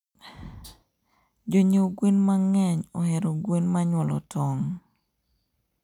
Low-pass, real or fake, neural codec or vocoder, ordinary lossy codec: 19.8 kHz; real; none; none